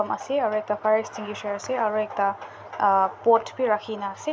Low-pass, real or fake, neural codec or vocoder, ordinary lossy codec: none; real; none; none